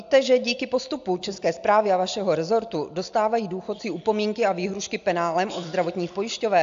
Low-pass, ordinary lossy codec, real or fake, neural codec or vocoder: 7.2 kHz; AAC, 64 kbps; real; none